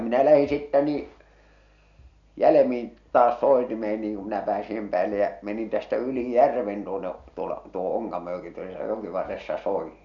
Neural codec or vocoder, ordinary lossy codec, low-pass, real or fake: none; none; 7.2 kHz; real